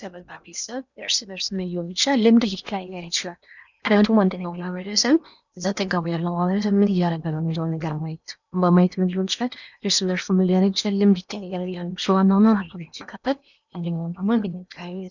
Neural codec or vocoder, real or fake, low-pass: codec, 16 kHz in and 24 kHz out, 0.8 kbps, FocalCodec, streaming, 65536 codes; fake; 7.2 kHz